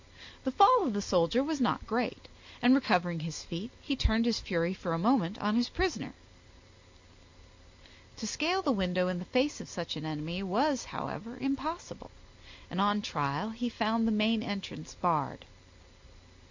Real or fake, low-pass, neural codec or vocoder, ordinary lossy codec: real; 7.2 kHz; none; MP3, 64 kbps